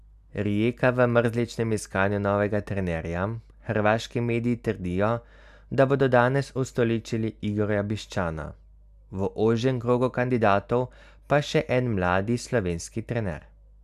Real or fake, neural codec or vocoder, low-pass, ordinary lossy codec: real; none; 14.4 kHz; none